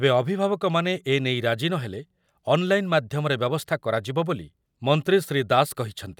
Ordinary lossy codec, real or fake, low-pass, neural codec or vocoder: none; real; 19.8 kHz; none